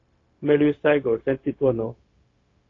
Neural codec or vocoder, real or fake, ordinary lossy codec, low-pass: codec, 16 kHz, 0.4 kbps, LongCat-Audio-Codec; fake; AAC, 32 kbps; 7.2 kHz